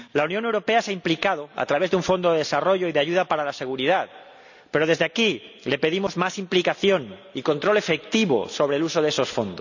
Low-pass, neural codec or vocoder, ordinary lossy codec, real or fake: 7.2 kHz; none; none; real